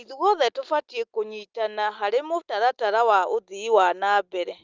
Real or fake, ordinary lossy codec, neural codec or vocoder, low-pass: real; Opus, 24 kbps; none; 7.2 kHz